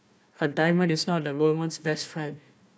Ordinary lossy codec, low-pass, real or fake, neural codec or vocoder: none; none; fake; codec, 16 kHz, 1 kbps, FunCodec, trained on Chinese and English, 50 frames a second